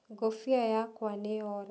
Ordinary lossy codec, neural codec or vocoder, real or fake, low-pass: none; none; real; none